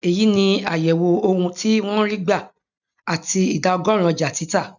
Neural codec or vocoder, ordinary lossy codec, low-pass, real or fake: none; none; 7.2 kHz; real